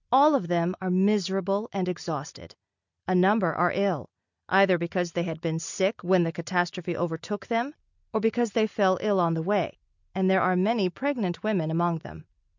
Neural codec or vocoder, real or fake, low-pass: none; real; 7.2 kHz